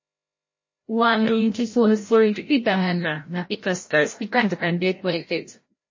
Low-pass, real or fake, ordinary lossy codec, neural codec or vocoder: 7.2 kHz; fake; MP3, 32 kbps; codec, 16 kHz, 0.5 kbps, FreqCodec, larger model